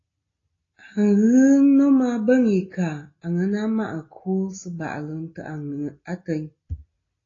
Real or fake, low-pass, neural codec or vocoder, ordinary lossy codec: real; 7.2 kHz; none; AAC, 32 kbps